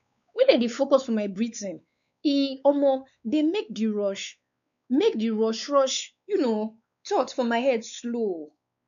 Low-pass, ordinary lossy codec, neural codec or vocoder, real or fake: 7.2 kHz; none; codec, 16 kHz, 4 kbps, X-Codec, WavLM features, trained on Multilingual LibriSpeech; fake